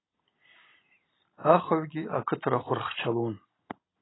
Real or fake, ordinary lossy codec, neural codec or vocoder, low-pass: real; AAC, 16 kbps; none; 7.2 kHz